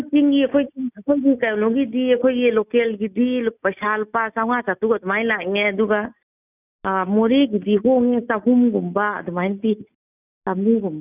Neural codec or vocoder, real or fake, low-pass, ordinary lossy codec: none; real; 3.6 kHz; none